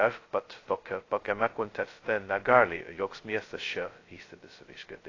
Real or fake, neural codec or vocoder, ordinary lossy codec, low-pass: fake; codec, 16 kHz, 0.2 kbps, FocalCodec; AAC, 32 kbps; 7.2 kHz